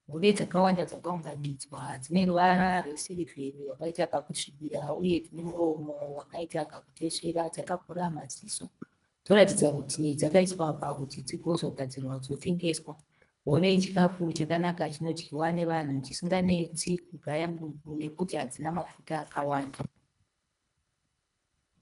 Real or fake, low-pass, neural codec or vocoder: fake; 10.8 kHz; codec, 24 kHz, 1.5 kbps, HILCodec